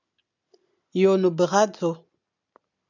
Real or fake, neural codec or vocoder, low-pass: real; none; 7.2 kHz